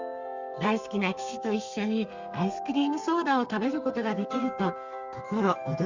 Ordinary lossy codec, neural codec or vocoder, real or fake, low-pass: Opus, 64 kbps; codec, 32 kHz, 1.9 kbps, SNAC; fake; 7.2 kHz